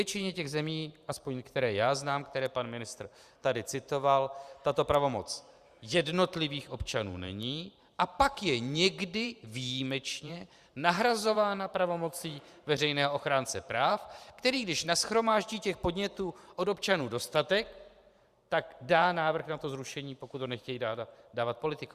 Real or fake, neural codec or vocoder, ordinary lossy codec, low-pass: fake; vocoder, 44.1 kHz, 128 mel bands every 512 samples, BigVGAN v2; Opus, 64 kbps; 14.4 kHz